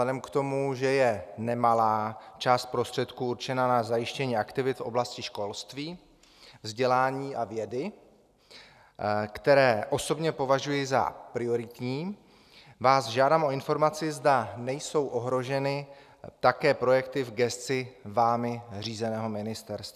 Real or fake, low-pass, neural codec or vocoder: real; 14.4 kHz; none